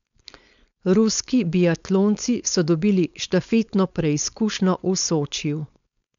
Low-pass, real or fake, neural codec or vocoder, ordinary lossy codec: 7.2 kHz; fake; codec, 16 kHz, 4.8 kbps, FACodec; MP3, 96 kbps